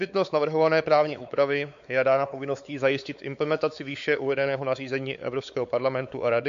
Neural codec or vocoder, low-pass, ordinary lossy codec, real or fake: codec, 16 kHz, 4 kbps, X-Codec, WavLM features, trained on Multilingual LibriSpeech; 7.2 kHz; MP3, 64 kbps; fake